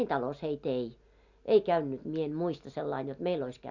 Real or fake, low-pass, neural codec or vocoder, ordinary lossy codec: real; 7.2 kHz; none; Opus, 64 kbps